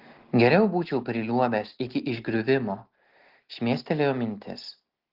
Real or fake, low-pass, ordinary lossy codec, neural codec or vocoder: real; 5.4 kHz; Opus, 16 kbps; none